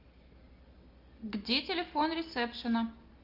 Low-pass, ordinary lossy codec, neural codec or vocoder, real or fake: 5.4 kHz; Opus, 32 kbps; none; real